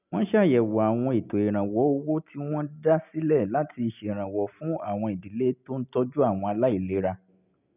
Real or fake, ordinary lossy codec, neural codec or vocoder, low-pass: real; none; none; 3.6 kHz